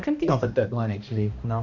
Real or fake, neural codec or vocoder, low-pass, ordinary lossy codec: fake; codec, 16 kHz, 1 kbps, X-Codec, HuBERT features, trained on balanced general audio; 7.2 kHz; none